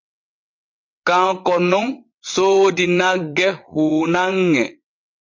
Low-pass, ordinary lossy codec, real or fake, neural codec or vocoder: 7.2 kHz; MP3, 48 kbps; fake; vocoder, 22.05 kHz, 80 mel bands, WaveNeXt